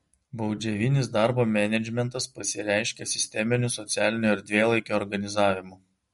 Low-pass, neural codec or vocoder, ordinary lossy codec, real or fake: 19.8 kHz; none; MP3, 48 kbps; real